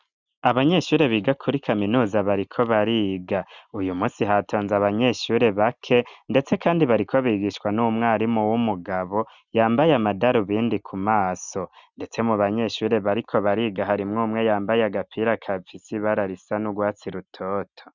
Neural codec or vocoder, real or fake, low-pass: none; real; 7.2 kHz